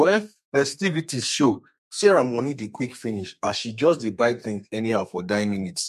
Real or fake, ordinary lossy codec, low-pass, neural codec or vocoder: fake; MP3, 64 kbps; 14.4 kHz; codec, 32 kHz, 1.9 kbps, SNAC